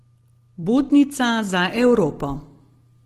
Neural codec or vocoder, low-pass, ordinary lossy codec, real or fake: none; 14.4 kHz; Opus, 16 kbps; real